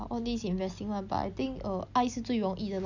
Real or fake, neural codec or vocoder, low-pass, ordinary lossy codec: real; none; 7.2 kHz; none